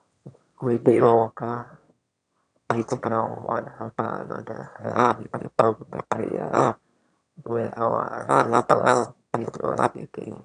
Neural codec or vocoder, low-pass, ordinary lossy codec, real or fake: autoencoder, 22.05 kHz, a latent of 192 numbers a frame, VITS, trained on one speaker; 9.9 kHz; none; fake